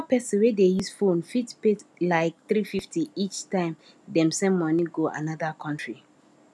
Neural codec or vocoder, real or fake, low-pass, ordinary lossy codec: none; real; none; none